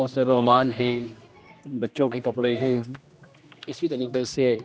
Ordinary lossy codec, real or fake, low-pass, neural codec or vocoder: none; fake; none; codec, 16 kHz, 1 kbps, X-Codec, HuBERT features, trained on general audio